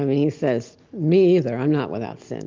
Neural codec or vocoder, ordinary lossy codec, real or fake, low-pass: none; Opus, 24 kbps; real; 7.2 kHz